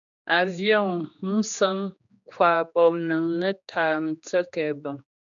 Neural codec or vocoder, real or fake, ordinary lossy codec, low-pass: codec, 16 kHz, 2 kbps, X-Codec, HuBERT features, trained on general audio; fake; AAC, 64 kbps; 7.2 kHz